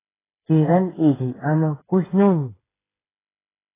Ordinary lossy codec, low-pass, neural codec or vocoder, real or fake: AAC, 16 kbps; 3.6 kHz; codec, 16 kHz, 8 kbps, FreqCodec, smaller model; fake